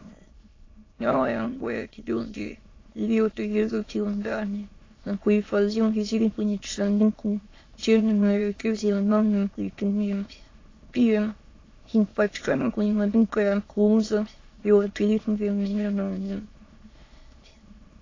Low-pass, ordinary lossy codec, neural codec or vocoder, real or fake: 7.2 kHz; AAC, 32 kbps; autoencoder, 22.05 kHz, a latent of 192 numbers a frame, VITS, trained on many speakers; fake